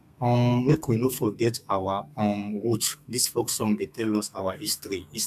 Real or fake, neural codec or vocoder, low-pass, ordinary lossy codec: fake; codec, 32 kHz, 1.9 kbps, SNAC; 14.4 kHz; none